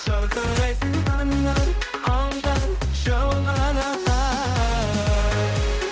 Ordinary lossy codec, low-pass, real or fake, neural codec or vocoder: none; none; fake; codec, 16 kHz, 0.5 kbps, X-Codec, HuBERT features, trained on balanced general audio